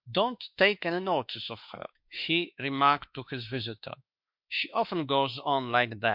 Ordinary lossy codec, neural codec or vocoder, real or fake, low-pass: MP3, 48 kbps; autoencoder, 48 kHz, 32 numbers a frame, DAC-VAE, trained on Japanese speech; fake; 5.4 kHz